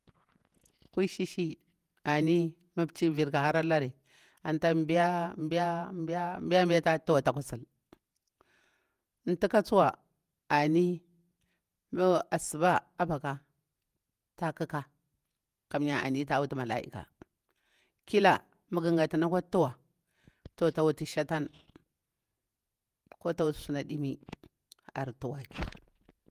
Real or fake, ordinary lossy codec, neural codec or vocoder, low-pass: fake; Opus, 32 kbps; vocoder, 48 kHz, 128 mel bands, Vocos; 14.4 kHz